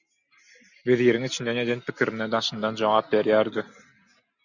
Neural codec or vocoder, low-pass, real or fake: none; 7.2 kHz; real